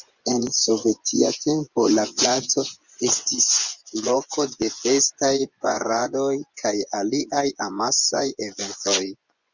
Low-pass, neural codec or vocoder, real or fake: 7.2 kHz; vocoder, 24 kHz, 100 mel bands, Vocos; fake